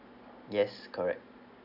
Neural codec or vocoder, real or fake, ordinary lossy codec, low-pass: none; real; none; 5.4 kHz